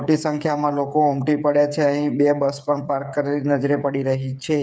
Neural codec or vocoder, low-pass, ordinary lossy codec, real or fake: codec, 16 kHz, 8 kbps, FreqCodec, smaller model; none; none; fake